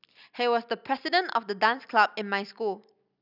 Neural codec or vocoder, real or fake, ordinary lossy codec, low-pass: none; real; none; 5.4 kHz